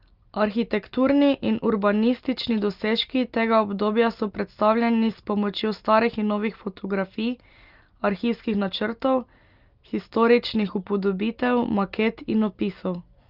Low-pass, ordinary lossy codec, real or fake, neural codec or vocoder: 5.4 kHz; Opus, 32 kbps; real; none